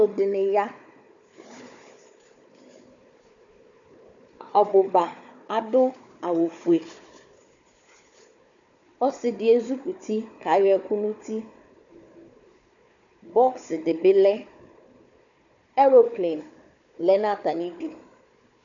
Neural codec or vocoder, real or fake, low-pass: codec, 16 kHz, 4 kbps, FunCodec, trained on Chinese and English, 50 frames a second; fake; 7.2 kHz